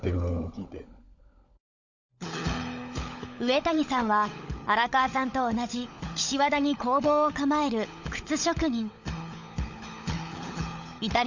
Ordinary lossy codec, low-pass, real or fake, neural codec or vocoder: Opus, 64 kbps; 7.2 kHz; fake; codec, 16 kHz, 16 kbps, FunCodec, trained on LibriTTS, 50 frames a second